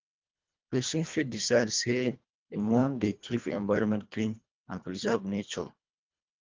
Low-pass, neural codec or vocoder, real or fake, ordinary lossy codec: 7.2 kHz; codec, 24 kHz, 1.5 kbps, HILCodec; fake; Opus, 24 kbps